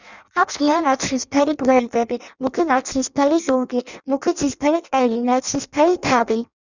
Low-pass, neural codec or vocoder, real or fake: 7.2 kHz; codec, 16 kHz in and 24 kHz out, 0.6 kbps, FireRedTTS-2 codec; fake